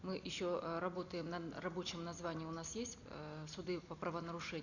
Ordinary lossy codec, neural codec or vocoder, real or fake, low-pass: none; none; real; 7.2 kHz